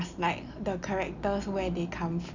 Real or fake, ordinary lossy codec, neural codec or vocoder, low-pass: real; none; none; 7.2 kHz